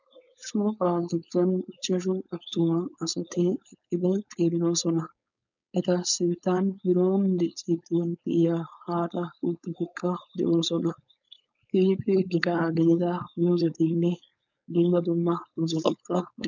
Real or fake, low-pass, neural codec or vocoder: fake; 7.2 kHz; codec, 16 kHz, 4.8 kbps, FACodec